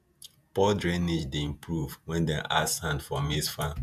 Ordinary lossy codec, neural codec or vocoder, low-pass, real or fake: none; vocoder, 48 kHz, 128 mel bands, Vocos; 14.4 kHz; fake